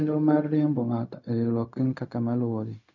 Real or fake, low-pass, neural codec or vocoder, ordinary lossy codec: fake; 7.2 kHz; codec, 16 kHz, 0.4 kbps, LongCat-Audio-Codec; none